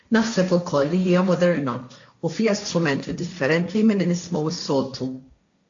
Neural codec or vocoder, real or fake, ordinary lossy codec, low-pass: codec, 16 kHz, 1.1 kbps, Voila-Tokenizer; fake; AAC, 64 kbps; 7.2 kHz